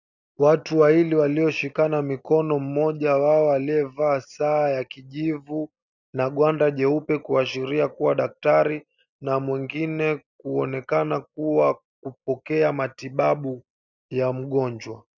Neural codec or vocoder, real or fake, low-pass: none; real; 7.2 kHz